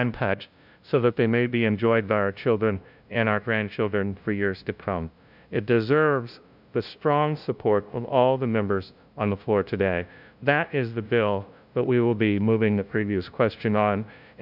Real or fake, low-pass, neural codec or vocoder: fake; 5.4 kHz; codec, 16 kHz, 0.5 kbps, FunCodec, trained on LibriTTS, 25 frames a second